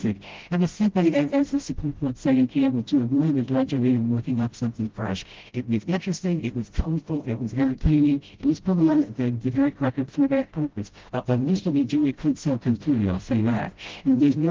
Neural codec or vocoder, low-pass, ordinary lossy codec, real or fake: codec, 16 kHz, 0.5 kbps, FreqCodec, smaller model; 7.2 kHz; Opus, 16 kbps; fake